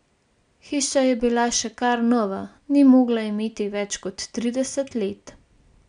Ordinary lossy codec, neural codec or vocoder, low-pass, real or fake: none; none; 9.9 kHz; real